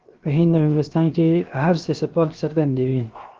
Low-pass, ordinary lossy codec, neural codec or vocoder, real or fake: 7.2 kHz; Opus, 32 kbps; codec, 16 kHz, 0.7 kbps, FocalCodec; fake